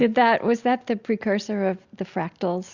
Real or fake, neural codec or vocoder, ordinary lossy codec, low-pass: real; none; Opus, 64 kbps; 7.2 kHz